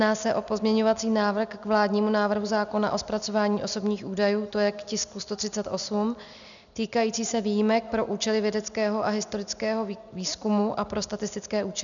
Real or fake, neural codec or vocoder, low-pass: real; none; 7.2 kHz